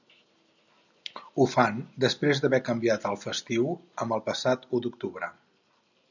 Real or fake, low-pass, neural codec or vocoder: real; 7.2 kHz; none